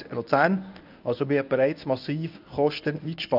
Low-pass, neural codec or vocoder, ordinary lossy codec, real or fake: 5.4 kHz; codec, 24 kHz, 0.9 kbps, WavTokenizer, medium speech release version 1; none; fake